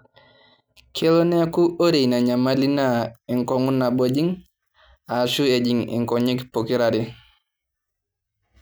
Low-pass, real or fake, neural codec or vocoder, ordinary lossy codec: none; real; none; none